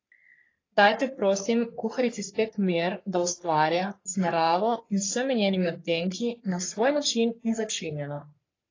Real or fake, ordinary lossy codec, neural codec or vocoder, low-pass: fake; AAC, 32 kbps; codec, 44.1 kHz, 3.4 kbps, Pupu-Codec; 7.2 kHz